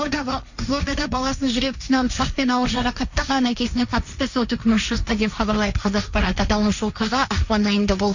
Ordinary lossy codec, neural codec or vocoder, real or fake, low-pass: none; codec, 16 kHz, 1.1 kbps, Voila-Tokenizer; fake; 7.2 kHz